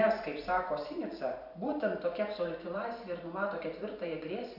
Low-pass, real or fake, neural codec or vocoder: 5.4 kHz; fake; vocoder, 44.1 kHz, 128 mel bands every 512 samples, BigVGAN v2